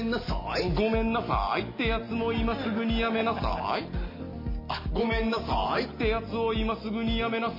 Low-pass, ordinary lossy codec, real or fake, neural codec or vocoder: 5.4 kHz; MP3, 24 kbps; real; none